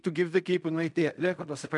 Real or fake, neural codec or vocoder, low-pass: fake; codec, 16 kHz in and 24 kHz out, 0.4 kbps, LongCat-Audio-Codec, fine tuned four codebook decoder; 10.8 kHz